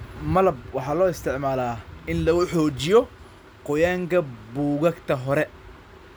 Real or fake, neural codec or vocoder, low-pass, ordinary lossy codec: real; none; none; none